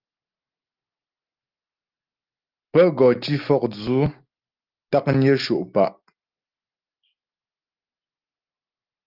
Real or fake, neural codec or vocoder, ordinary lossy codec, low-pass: real; none; Opus, 32 kbps; 5.4 kHz